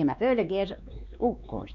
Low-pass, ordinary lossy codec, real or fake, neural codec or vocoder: 7.2 kHz; none; fake; codec, 16 kHz, 2 kbps, X-Codec, WavLM features, trained on Multilingual LibriSpeech